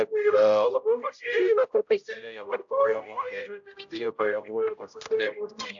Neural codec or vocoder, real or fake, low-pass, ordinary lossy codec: codec, 16 kHz, 0.5 kbps, X-Codec, HuBERT features, trained on general audio; fake; 7.2 kHz; AAC, 64 kbps